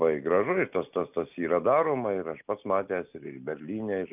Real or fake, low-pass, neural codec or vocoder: real; 3.6 kHz; none